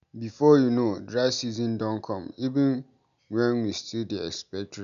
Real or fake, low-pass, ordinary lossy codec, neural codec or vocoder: real; 7.2 kHz; none; none